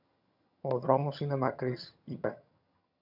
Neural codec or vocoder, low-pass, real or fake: vocoder, 22.05 kHz, 80 mel bands, HiFi-GAN; 5.4 kHz; fake